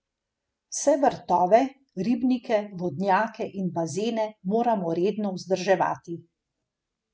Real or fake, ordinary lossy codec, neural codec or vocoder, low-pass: real; none; none; none